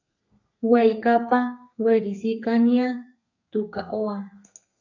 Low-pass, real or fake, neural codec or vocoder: 7.2 kHz; fake; codec, 32 kHz, 1.9 kbps, SNAC